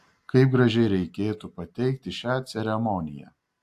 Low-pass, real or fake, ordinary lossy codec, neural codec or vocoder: 14.4 kHz; real; AAC, 64 kbps; none